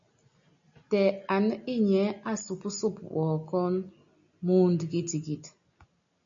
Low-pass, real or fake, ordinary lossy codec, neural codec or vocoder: 7.2 kHz; real; MP3, 64 kbps; none